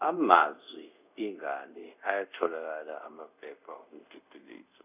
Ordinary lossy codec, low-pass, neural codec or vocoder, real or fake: none; 3.6 kHz; codec, 24 kHz, 0.5 kbps, DualCodec; fake